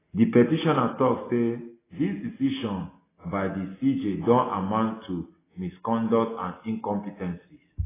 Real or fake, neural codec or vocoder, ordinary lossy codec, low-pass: real; none; AAC, 16 kbps; 3.6 kHz